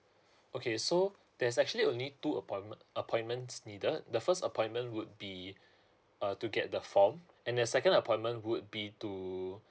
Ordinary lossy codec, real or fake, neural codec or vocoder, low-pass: none; real; none; none